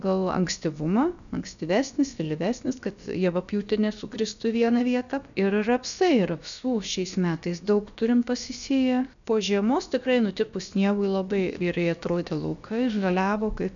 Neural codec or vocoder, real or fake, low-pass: codec, 16 kHz, about 1 kbps, DyCAST, with the encoder's durations; fake; 7.2 kHz